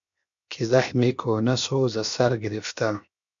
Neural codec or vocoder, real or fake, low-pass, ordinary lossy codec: codec, 16 kHz, 0.7 kbps, FocalCodec; fake; 7.2 kHz; MP3, 64 kbps